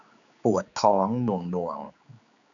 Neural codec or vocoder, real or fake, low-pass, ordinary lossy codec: codec, 16 kHz, 4 kbps, X-Codec, HuBERT features, trained on general audio; fake; 7.2 kHz; none